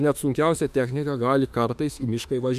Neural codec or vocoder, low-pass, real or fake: autoencoder, 48 kHz, 32 numbers a frame, DAC-VAE, trained on Japanese speech; 14.4 kHz; fake